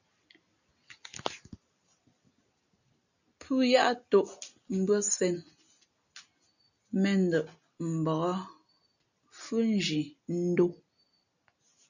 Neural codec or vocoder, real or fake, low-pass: none; real; 7.2 kHz